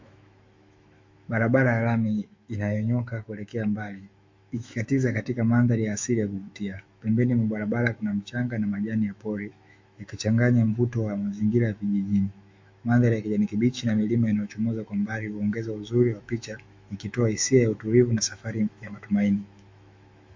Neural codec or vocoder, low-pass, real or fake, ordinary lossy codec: autoencoder, 48 kHz, 128 numbers a frame, DAC-VAE, trained on Japanese speech; 7.2 kHz; fake; MP3, 48 kbps